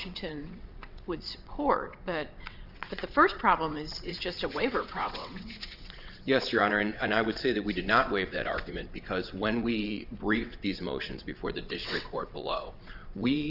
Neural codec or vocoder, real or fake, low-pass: vocoder, 22.05 kHz, 80 mel bands, WaveNeXt; fake; 5.4 kHz